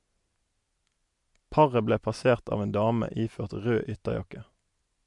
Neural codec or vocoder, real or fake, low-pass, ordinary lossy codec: none; real; 10.8 kHz; MP3, 64 kbps